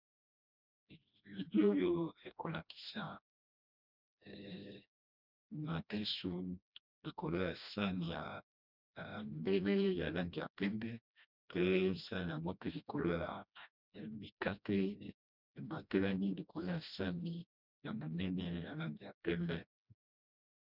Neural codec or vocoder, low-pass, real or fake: codec, 16 kHz, 1 kbps, FreqCodec, smaller model; 5.4 kHz; fake